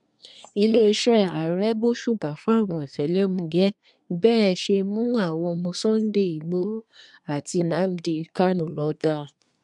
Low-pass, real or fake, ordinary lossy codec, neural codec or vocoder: 10.8 kHz; fake; none; codec, 24 kHz, 1 kbps, SNAC